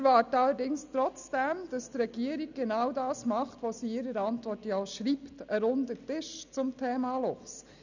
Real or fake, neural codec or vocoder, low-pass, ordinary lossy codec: real; none; 7.2 kHz; none